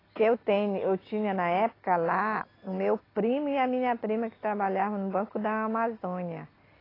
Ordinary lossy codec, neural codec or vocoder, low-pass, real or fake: AAC, 24 kbps; none; 5.4 kHz; real